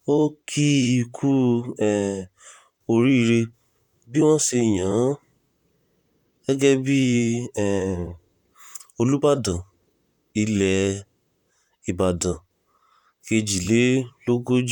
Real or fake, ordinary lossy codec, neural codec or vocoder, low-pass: fake; none; vocoder, 44.1 kHz, 128 mel bands, Pupu-Vocoder; 19.8 kHz